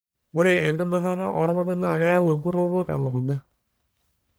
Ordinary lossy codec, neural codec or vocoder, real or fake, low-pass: none; codec, 44.1 kHz, 1.7 kbps, Pupu-Codec; fake; none